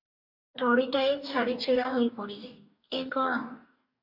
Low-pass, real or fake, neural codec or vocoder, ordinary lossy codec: 5.4 kHz; fake; codec, 44.1 kHz, 2.6 kbps, DAC; AAC, 48 kbps